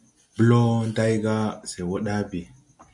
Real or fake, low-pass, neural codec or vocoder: real; 10.8 kHz; none